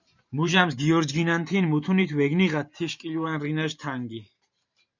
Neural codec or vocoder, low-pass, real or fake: vocoder, 44.1 kHz, 128 mel bands every 512 samples, BigVGAN v2; 7.2 kHz; fake